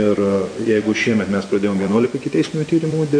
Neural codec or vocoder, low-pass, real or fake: vocoder, 24 kHz, 100 mel bands, Vocos; 9.9 kHz; fake